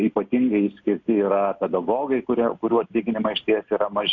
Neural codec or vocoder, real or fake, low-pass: none; real; 7.2 kHz